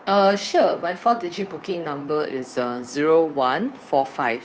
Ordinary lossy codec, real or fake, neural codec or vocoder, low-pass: none; fake; codec, 16 kHz, 2 kbps, FunCodec, trained on Chinese and English, 25 frames a second; none